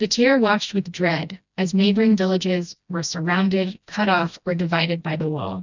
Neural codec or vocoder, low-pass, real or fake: codec, 16 kHz, 1 kbps, FreqCodec, smaller model; 7.2 kHz; fake